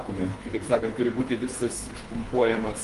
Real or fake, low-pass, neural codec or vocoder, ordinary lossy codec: fake; 10.8 kHz; codec, 24 kHz, 3 kbps, HILCodec; Opus, 32 kbps